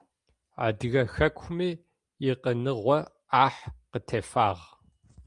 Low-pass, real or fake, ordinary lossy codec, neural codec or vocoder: 10.8 kHz; real; Opus, 32 kbps; none